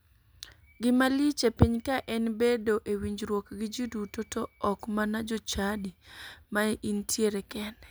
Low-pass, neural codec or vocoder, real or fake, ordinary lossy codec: none; none; real; none